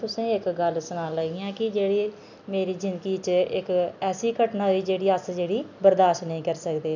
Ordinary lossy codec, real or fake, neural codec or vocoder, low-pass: none; real; none; 7.2 kHz